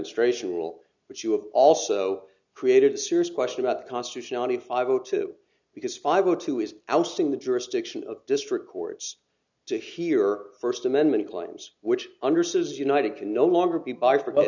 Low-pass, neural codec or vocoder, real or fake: 7.2 kHz; none; real